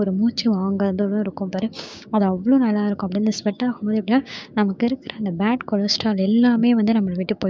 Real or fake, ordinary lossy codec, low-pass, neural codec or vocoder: fake; none; none; codec, 16 kHz, 6 kbps, DAC